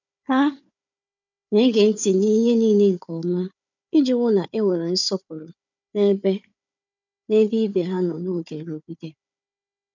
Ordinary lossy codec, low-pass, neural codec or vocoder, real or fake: none; 7.2 kHz; codec, 16 kHz, 4 kbps, FunCodec, trained on Chinese and English, 50 frames a second; fake